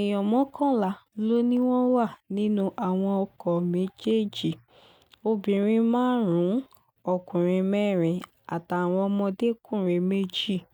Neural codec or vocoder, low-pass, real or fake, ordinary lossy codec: none; 19.8 kHz; real; none